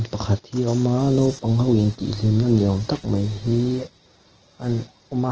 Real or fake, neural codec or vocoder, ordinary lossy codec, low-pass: real; none; Opus, 16 kbps; 7.2 kHz